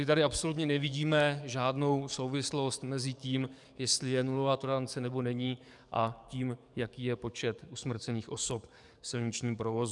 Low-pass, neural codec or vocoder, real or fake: 10.8 kHz; codec, 44.1 kHz, 7.8 kbps, DAC; fake